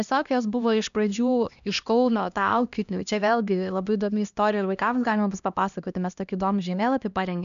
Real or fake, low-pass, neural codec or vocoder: fake; 7.2 kHz; codec, 16 kHz, 1 kbps, X-Codec, HuBERT features, trained on LibriSpeech